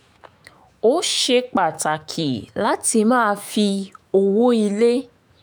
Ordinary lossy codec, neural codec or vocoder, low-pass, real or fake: none; autoencoder, 48 kHz, 128 numbers a frame, DAC-VAE, trained on Japanese speech; none; fake